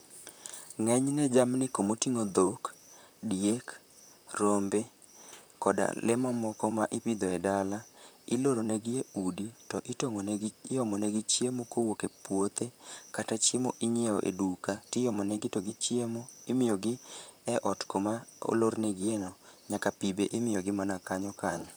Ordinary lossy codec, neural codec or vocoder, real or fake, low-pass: none; vocoder, 44.1 kHz, 128 mel bands, Pupu-Vocoder; fake; none